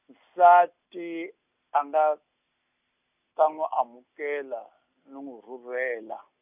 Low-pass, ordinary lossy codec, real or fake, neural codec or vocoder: 3.6 kHz; none; real; none